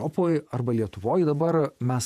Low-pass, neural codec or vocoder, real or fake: 14.4 kHz; vocoder, 48 kHz, 128 mel bands, Vocos; fake